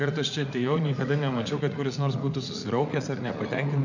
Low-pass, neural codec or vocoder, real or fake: 7.2 kHz; vocoder, 44.1 kHz, 80 mel bands, Vocos; fake